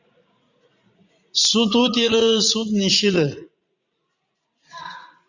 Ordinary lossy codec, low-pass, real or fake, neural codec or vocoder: Opus, 64 kbps; 7.2 kHz; fake; vocoder, 44.1 kHz, 80 mel bands, Vocos